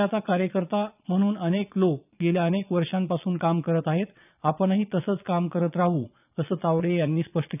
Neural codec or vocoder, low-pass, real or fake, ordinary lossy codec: vocoder, 22.05 kHz, 80 mel bands, Vocos; 3.6 kHz; fake; none